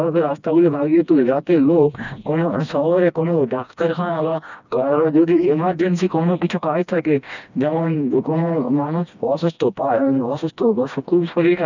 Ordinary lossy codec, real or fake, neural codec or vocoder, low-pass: none; fake; codec, 16 kHz, 1 kbps, FreqCodec, smaller model; 7.2 kHz